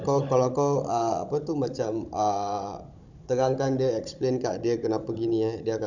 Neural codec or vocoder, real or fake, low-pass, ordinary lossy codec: codec, 16 kHz, 16 kbps, FunCodec, trained on Chinese and English, 50 frames a second; fake; 7.2 kHz; none